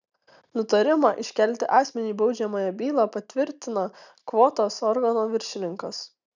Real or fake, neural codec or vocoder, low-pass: real; none; 7.2 kHz